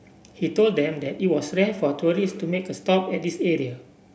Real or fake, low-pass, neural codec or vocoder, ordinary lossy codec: real; none; none; none